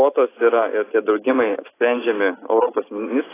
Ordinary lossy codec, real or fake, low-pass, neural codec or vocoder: AAC, 16 kbps; real; 3.6 kHz; none